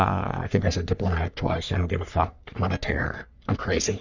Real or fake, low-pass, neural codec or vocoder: fake; 7.2 kHz; codec, 44.1 kHz, 3.4 kbps, Pupu-Codec